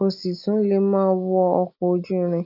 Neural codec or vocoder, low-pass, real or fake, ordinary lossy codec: none; 5.4 kHz; real; none